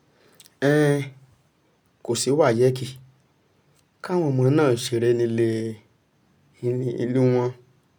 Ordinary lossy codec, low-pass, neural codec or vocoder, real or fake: none; none; none; real